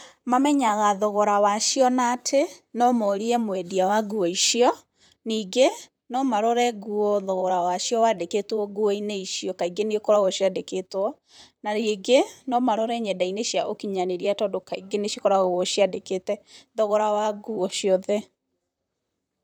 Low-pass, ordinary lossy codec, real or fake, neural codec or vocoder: none; none; fake; vocoder, 44.1 kHz, 128 mel bands, Pupu-Vocoder